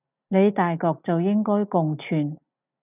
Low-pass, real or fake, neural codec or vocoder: 3.6 kHz; real; none